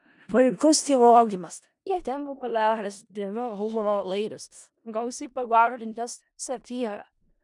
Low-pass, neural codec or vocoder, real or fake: 10.8 kHz; codec, 16 kHz in and 24 kHz out, 0.4 kbps, LongCat-Audio-Codec, four codebook decoder; fake